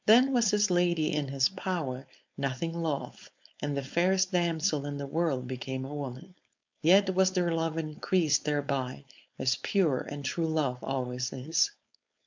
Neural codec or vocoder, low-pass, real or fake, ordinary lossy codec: codec, 16 kHz, 4.8 kbps, FACodec; 7.2 kHz; fake; MP3, 64 kbps